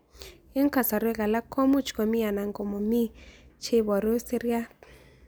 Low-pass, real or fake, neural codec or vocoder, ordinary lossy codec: none; real; none; none